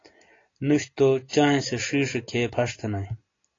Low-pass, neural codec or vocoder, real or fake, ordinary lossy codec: 7.2 kHz; none; real; AAC, 32 kbps